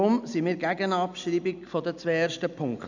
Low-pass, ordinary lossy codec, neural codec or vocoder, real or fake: 7.2 kHz; none; none; real